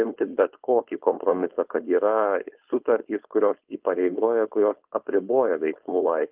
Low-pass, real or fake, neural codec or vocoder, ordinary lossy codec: 3.6 kHz; fake; codec, 16 kHz, 4.8 kbps, FACodec; Opus, 32 kbps